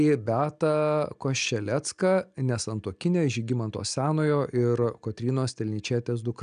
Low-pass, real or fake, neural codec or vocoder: 9.9 kHz; real; none